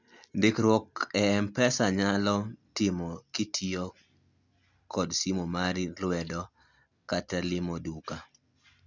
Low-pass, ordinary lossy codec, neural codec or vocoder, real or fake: 7.2 kHz; none; none; real